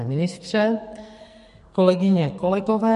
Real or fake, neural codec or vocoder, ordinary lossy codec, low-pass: fake; codec, 44.1 kHz, 2.6 kbps, SNAC; MP3, 48 kbps; 14.4 kHz